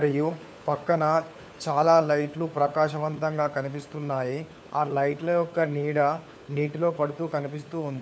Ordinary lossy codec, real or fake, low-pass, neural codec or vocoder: none; fake; none; codec, 16 kHz, 4 kbps, FunCodec, trained on LibriTTS, 50 frames a second